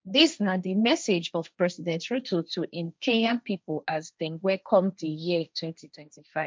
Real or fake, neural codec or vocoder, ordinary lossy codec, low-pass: fake; codec, 16 kHz, 1.1 kbps, Voila-Tokenizer; none; none